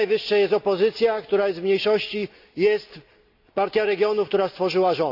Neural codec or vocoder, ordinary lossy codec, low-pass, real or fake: none; AAC, 48 kbps; 5.4 kHz; real